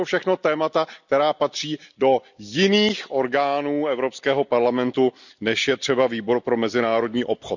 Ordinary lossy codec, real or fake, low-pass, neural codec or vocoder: none; real; 7.2 kHz; none